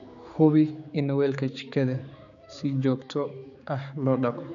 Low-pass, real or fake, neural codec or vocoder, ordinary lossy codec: 7.2 kHz; fake; codec, 16 kHz, 4 kbps, X-Codec, HuBERT features, trained on general audio; none